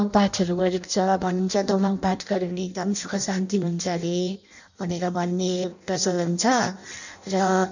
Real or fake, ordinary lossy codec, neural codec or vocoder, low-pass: fake; none; codec, 16 kHz in and 24 kHz out, 0.6 kbps, FireRedTTS-2 codec; 7.2 kHz